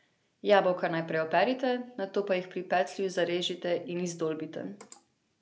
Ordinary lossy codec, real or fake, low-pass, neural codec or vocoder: none; real; none; none